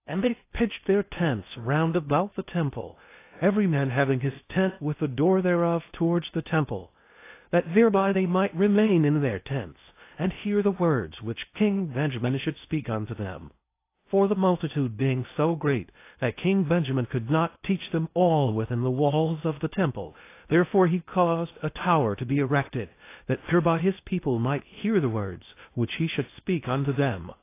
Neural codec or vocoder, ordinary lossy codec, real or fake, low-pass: codec, 16 kHz in and 24 kHz out, 0.6 kbps, FocalCodec, streaming, 4096 codes; AAC, 24 kbps; fake; 3.6 kHz